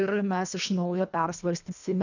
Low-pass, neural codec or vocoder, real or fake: 7.2 kHz; codec, 24 kHz, 1.5 kbps, HILCodec; fake